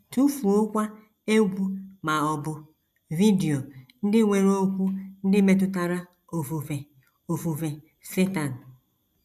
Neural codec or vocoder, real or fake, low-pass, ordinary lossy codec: none; real; 14.4 kHz; none